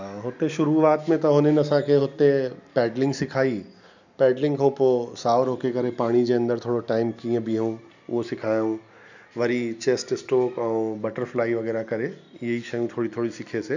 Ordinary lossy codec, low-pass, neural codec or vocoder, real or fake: none; 7.2 kHz; none; real